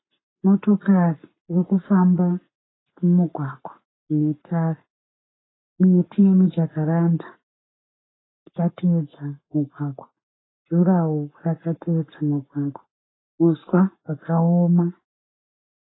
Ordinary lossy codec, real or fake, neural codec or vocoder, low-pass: AAC, 16 kbps; fake; codec, 44.1 kHz, 7.8 kbps, Pupu-Codec; 7.2 kHz